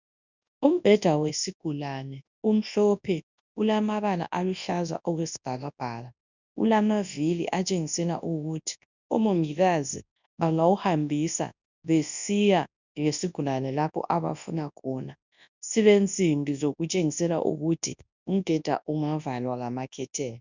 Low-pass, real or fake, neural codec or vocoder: 7.2 kHz; fake; codec, 24 kHz, 0.9 kbps, WavTokenizer, large speech release